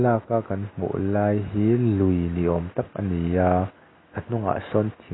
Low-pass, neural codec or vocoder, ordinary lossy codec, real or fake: 7.2 kHz; none; AAC, 16 kbps; real